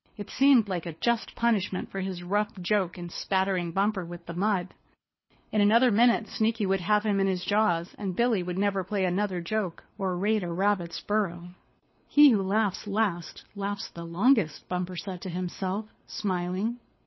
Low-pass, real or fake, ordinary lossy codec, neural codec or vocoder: 7.2 kHz; fake; MP3, 24 kbps; codec, 24 kHz, 6 kbps, HILCodec